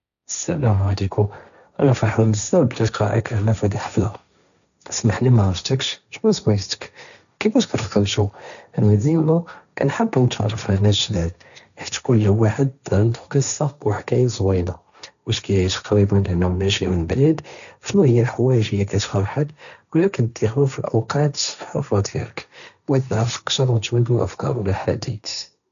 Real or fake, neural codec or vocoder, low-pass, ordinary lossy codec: fake; codec, 16 kHz, 1.1 kbps, Voila-Tokenizer; 7.2 kHz; none